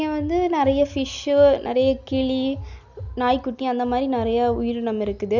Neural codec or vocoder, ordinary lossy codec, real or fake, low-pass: none; none; real; 7.2 kHz